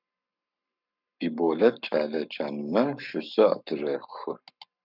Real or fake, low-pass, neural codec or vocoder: fake; 5.4 kHz; codec, 44.1 kHz, 7.8 kbps, Pupu-Codec